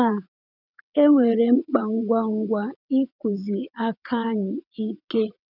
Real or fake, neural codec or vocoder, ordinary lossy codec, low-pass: fake; vocoder, 22.05 kHz, 80 mel bands, WaveNeXt; none; 5.4 kHz